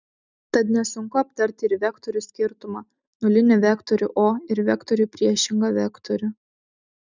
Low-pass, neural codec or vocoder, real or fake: 7.2 kHz; none; real